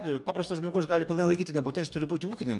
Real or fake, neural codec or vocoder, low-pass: fake; codec, 44.1 kHz, 2.6 kbps, DAC; 10.8 kHz